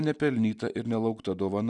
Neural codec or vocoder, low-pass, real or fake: none; 10.8 kHz; real